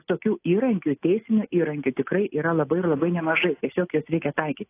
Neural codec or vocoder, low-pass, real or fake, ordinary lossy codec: none; 3.6 kHz; real; AAC, 24 kbps